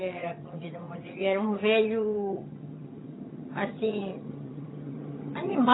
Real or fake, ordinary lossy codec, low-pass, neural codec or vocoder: fake; AAC, 16 kbps; 7.2 kHz; vocoder, 22.05 kHz, 80 mel bands, HiFi-GAN